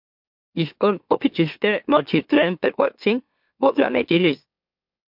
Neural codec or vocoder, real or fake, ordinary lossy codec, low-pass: autoencoder, 44.1 kHz, a latent of 192 numbers a frame, MeloTTS; fake; MP3, 48 kbps; 5.4 kHz